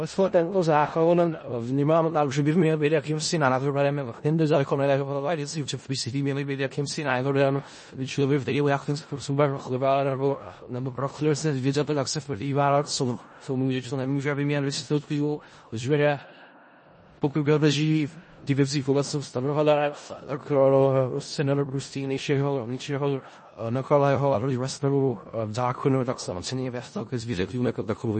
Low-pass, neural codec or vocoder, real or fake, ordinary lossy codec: 10.8 kHz; codec, 16 kHz in and 24 kHz out, 0.4 kbps, LongCat-Audio-Codec, four codebook decoder; fake; MP3, 32 kbps